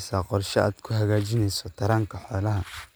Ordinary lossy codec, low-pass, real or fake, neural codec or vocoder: none; none; real; none